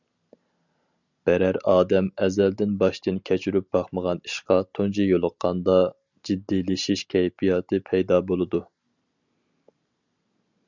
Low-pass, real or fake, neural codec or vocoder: 7.2 kHz; real; none